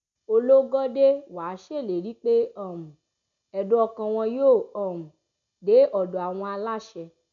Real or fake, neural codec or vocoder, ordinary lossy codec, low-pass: real; none; none; 7.2 kHz